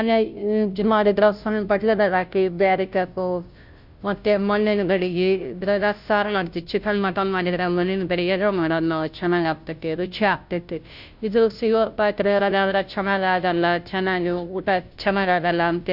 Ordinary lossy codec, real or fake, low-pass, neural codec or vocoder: Opus, 64 kbps; fake; 5.4 kHz; codec, 16 kHz, 0.5 kbps, FunCodec, trained on Chinese and English, 25 frames a second